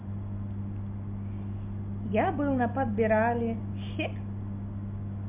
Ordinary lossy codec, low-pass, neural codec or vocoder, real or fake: MP3, 32 kbps; 3.6 kHz; none; real